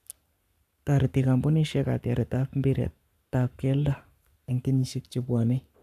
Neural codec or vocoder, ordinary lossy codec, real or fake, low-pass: codec, 44.1 kHz, 7.8 kbps, Pupu-Codec; none; fake; 14.4 kHz